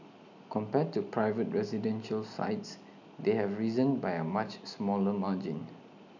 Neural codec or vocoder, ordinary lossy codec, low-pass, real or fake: none; none; 7.2 kHz; real